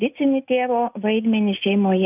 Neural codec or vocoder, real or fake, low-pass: none; real; 3.6 kHz